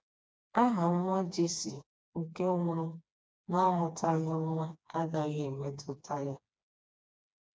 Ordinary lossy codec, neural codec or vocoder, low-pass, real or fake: none; codec, 16 kHz, 2 kbps, FreqCodec, smaller model; none; fake